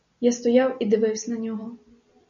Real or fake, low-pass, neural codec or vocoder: real; 7.2 kHz; none